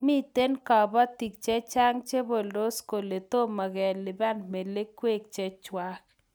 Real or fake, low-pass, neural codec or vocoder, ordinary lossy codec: real; none; none; none